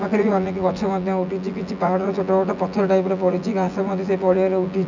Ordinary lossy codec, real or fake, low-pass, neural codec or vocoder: none; fake; 7.2 kHz; vocoder, 24 kHz, 100 mel bands, Vocos